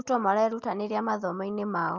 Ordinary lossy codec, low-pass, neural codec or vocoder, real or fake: Opus, 24 kbps; 7.2 kHz; none; real